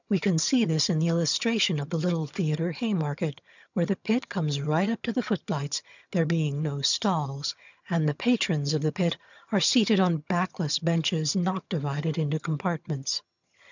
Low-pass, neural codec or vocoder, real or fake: 7.2 kHz; vocoder, 22.05 kHz, 80 mel bands, HiFi-GAN; fake